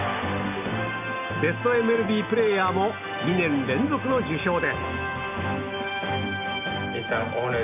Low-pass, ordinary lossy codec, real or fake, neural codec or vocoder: 3.6 kHz; none; real; none